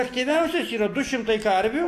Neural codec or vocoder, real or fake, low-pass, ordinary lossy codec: none; real; 14.4 kHz; AAC, 64 kbps